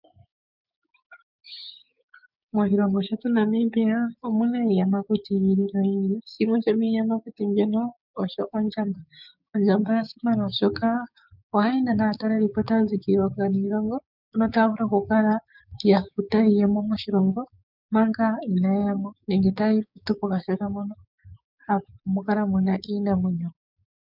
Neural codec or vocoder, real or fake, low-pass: codec, 16 kHz, 6 kbps, DAC; fake; 5.4 kHz